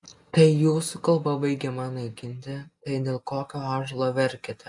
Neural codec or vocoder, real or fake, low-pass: none; real; 10.8 kHz